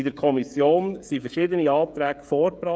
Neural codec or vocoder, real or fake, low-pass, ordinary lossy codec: codec, 16 kHz, 16 kbps, FreqCodec, smaller model; fake; none; none